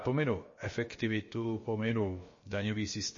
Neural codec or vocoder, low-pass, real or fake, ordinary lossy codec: codec, 16 kHz, about 1 kbps, DyCAST, with the encoder's durations; 7.2 kHz; fake; MP3, 32 kbps